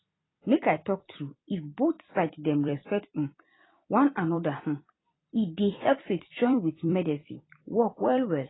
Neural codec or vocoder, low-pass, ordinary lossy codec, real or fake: vocoder, 44.1 kHz, 128 mel bands every 256 samples, BigVGAN v2; 7.2 kHz; AAC, 16 kbps; fake